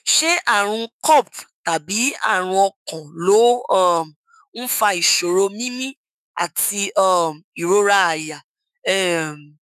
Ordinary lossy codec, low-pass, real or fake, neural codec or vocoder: none; 14.4 kHz; fake; autoencoder, 48 kHz, 128 numbers a frame, DAC-VAE, trained on Japanese speech